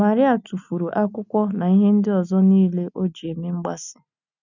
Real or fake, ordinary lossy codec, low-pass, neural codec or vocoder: real; none; 7.2 kHz; none